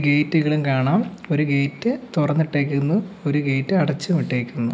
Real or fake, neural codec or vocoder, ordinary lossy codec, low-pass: real; none; none; none